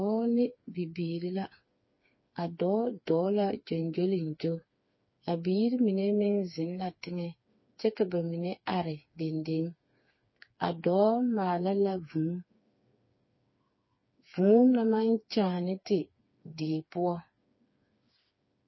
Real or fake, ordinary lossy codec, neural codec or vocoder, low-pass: fake; MP3, 24 kbps; codec, 16 kHz, 4 kbps, FreqCodec, smaller model; 7.2 kHz